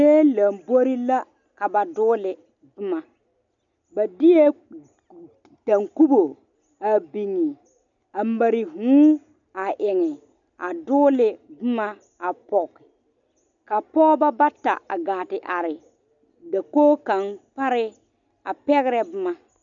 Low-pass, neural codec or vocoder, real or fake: 7.2 kHz; none; real